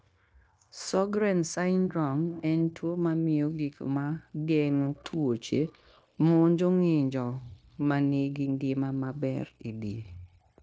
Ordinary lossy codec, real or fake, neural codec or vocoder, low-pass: none; fake; codec, 16 kHz, 0.9 kbps, LongCat-Audio-Codec; none